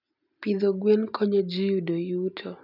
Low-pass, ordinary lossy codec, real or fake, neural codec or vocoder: 5.4 kHz; none; real; none